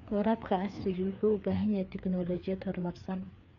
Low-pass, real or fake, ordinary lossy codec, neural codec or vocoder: 7.2 kHz; fake; none; codec, 16 kHz, 4 kbps, FreqCodec, larger model